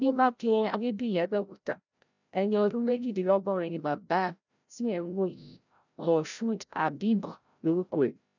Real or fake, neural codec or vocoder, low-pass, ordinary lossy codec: fake; codec, 16 kHz, 0.5 kbps, FreqCodec, larger model; 7.2 kHz; none